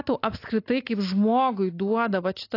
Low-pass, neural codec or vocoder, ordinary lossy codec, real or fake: 5.4 kHz; none; AAC, 32 kbps; real